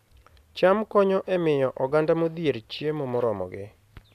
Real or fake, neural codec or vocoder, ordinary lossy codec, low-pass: real; none; none; 14.4 kHz